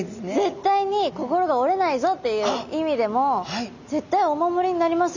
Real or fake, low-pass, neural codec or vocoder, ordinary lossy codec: real; 7.2 kHz; none; none